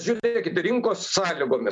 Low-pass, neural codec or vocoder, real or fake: 9.9 kHz; none; real